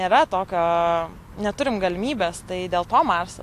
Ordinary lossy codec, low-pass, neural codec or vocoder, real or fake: AAC, 64 kbps; 14.4 kHz; none; real